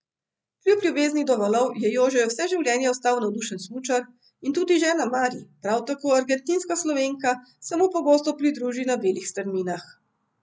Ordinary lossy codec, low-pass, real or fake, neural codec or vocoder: none; none; real; none